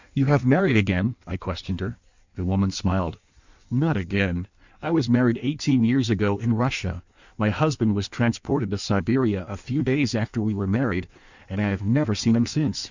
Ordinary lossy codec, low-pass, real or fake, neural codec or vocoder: Opus, 64 kbps; 7.2 kHz; fake; codec, 16 kHz in and 24 kHz out, 1.1 kbps, FireRedTTS-2 codec